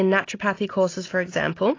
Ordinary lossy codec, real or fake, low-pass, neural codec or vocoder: AAC, 32 kbps; real; 7.2 kHz; none